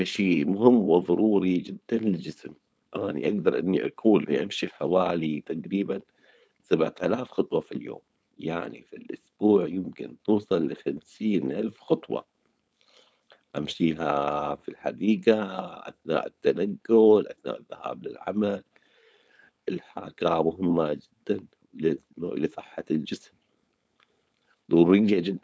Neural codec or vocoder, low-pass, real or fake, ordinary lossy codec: codec, 16 kHz, 4.8 kbps, FACodec; none; fake; none